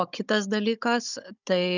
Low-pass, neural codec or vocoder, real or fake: 7.2 kHz; codec, 16 kHz, 16 kbps, FunCodec, trained on Chinese and English, 50 frames a second; fake